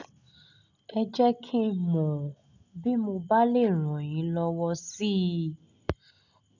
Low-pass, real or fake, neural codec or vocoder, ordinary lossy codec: 7.2 kHz; real; none; none